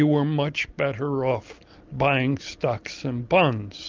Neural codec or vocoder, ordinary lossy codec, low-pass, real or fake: none; Opus, 32 kbps; 7.2 kHz; real